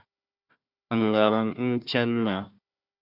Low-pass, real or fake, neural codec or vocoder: 5.4 kHz; fake; codec, 16 kHz, 1 kbps, FunCodec, trained on Chinese and English, 50 frames a second